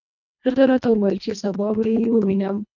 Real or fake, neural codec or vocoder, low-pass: fake; codec, 24 kHz, 1.5 kbps, HILCodec; 7.2 kHz